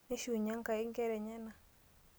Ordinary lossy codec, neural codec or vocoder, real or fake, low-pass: none; none; real; none